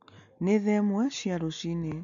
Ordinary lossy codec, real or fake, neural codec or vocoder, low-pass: none; real; none; 7.2 kHz